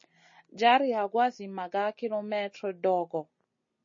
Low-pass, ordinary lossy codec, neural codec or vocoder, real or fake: 7.2 kHz; MP3, 32 kbps; none; real